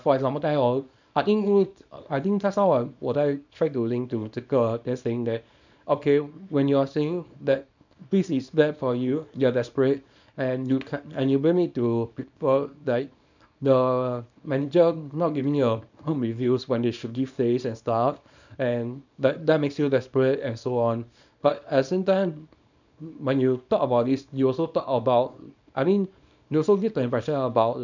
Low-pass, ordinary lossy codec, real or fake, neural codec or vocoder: 7.2 kHz; none; fake; codec, 24 kHz, 0.9 kbps, WavTokenizer, small release